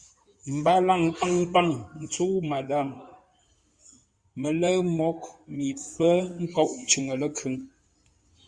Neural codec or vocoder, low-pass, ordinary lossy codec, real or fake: codec, 16 kHz in and 24 kHz out, 2.2 kbps, FireRedTTS-2 codec; 9.9 kHz; Opus, 64 kbps; fake